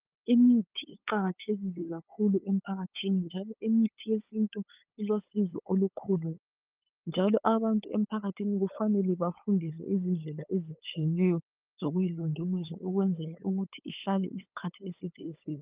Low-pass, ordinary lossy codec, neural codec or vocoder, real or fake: 3.6 kHz; Opus, 24 kbps; codec, 16 kHz, 8 kbps, FunCodec, trained on LibriTTS, 25 frames a second; fake